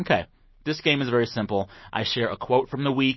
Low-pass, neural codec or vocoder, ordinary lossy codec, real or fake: 7.2 kHz; none; MP3, 24 kbps; real